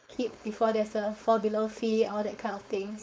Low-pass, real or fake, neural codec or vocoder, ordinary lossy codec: none; fake; codec, 16 kHz, 4.8 kbps, FACodec; none